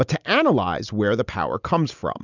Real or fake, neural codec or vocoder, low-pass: real; none; 7.2 kHz